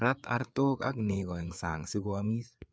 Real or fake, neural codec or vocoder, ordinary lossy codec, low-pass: fake; codec, 16 kHz, 4 kbps, FreqCodec, larger model; none; none